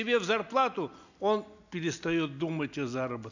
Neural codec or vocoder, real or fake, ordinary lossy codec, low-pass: none; real; none; 7.2 kHz